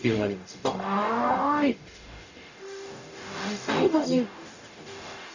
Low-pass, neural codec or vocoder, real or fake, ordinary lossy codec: 7.2 kHz; codec, 44.1 kHz, 0.9 kbps, DAC; fake; none